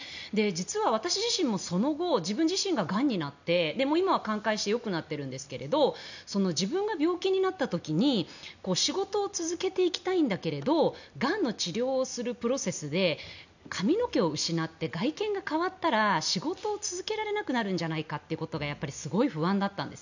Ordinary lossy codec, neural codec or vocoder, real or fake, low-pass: none; none; real; 7.2 kHz